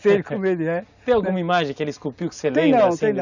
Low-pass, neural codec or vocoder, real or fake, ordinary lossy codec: 7.2 kHz; none; real; none